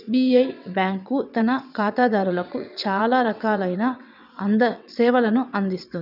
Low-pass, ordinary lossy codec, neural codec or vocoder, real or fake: 5.4 kHz; none; vocoder, 22.05 kHz, 80 mel bands, WaveNeXt; fake